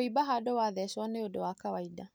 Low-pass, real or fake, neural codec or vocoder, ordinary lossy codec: none; real; none; none